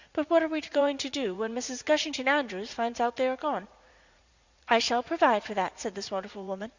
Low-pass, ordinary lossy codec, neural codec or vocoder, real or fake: 7.2 kHz; Opus, 64 kbps; vocoder, 44.1 kHz, 80 mel bands, Vocos; fake